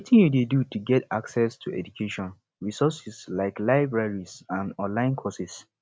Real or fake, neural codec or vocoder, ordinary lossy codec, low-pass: real; none; none; none